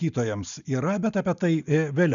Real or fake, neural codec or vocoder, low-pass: real; none; 7.2 kHz